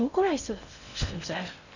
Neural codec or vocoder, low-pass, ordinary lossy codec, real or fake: codec, 16 kHz in and 24 kHz out, 0.6 kbps, FocalCodec, streaming, 2048 codes; 7.2 kHz; none; fake